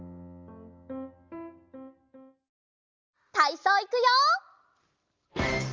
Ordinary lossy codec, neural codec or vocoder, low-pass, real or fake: Opus, 32 kbps; none; 7.2 kHz; real